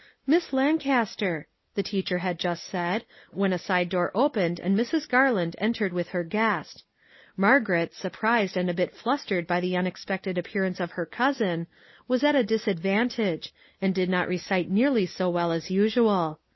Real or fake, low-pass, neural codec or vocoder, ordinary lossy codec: real; 7.2 kHz; none; MP3, 24 kbps